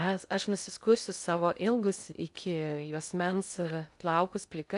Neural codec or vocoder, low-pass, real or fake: codec, 16 kHz in and 24 kHz out, 0.6 kbps, FocalCodec, streaming, 2048 codes; 10.8 kHz; fake